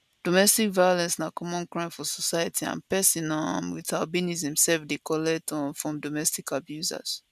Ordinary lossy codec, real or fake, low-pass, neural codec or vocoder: none; real; 14.4 kHz; none